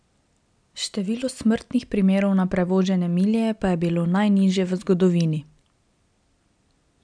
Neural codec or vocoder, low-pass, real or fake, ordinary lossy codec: none; 9.9 kHz; real; none